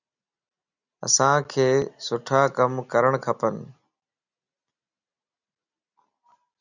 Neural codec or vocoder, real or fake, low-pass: none; real; 7.2 kHz